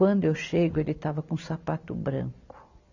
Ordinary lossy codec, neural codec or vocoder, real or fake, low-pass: none; none; real; 7.2 kHz